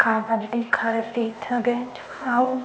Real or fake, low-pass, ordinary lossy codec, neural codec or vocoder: fake; none; none; codec, 16 kHz, 0.8 kbps, ZipCodec